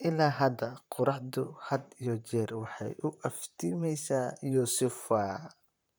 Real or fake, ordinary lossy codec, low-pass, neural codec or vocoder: fake; none; none; vocoder, 44.1 kHz, 128 mel bands, Pupu-Vocoder